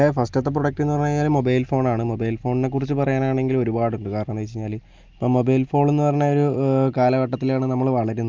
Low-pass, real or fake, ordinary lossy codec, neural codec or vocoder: 7.2 kHz; real; Opus, 32 kbps; none